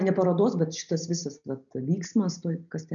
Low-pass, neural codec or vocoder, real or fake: 7.2 kHz; none; real